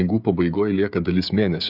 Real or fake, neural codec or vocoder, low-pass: fake; codec, 16 kHz, 16 kbps, FreqCodec, smaller model; 5.4 kHz